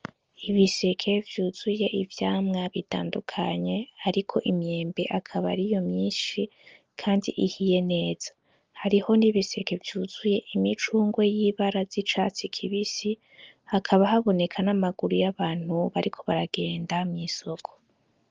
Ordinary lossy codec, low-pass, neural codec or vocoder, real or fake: Opus, 24 kbps; 7.2 kHz; none; real